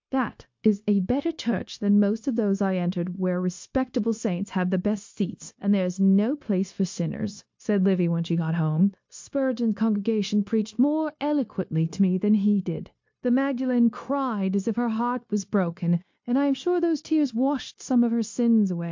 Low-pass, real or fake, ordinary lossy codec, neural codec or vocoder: 7.2 kHz; fake; MP3, 48 kbps; codec, 16 kHz, 0.9 kbps, LongCat-Audio-Codec